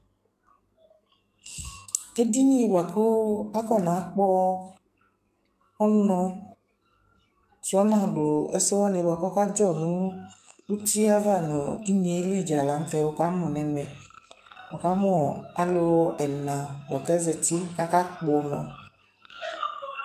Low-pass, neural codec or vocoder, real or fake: 14.4 kHz; codec, 44.1 kHz, 2.6 kbps, SNAC; fake